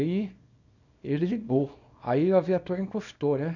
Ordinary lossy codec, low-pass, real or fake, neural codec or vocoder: none; 7.2 kHz; fake; codec, 24 kHz, 0.9 kbps, WavTokenizer, small release